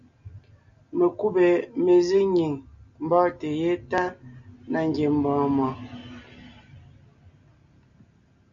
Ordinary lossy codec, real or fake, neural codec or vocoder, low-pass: MP3, 64 kbps; real; none; 7.2 kHz